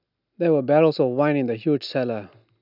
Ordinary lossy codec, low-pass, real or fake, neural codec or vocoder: none; 5.4 kHz; real; none